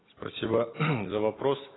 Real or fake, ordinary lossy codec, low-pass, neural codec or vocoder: real; AAC, 16 kbps; 7.2 kHz; none